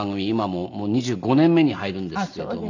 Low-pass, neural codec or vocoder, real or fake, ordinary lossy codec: 7.2 kHz; none; real; none